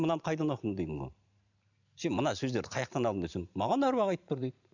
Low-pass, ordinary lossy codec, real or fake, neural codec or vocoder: 7.2 kHz; none; fake; vocoder, 44.1 kHz, 128 mel bands every 512 samples, BigVGAN v2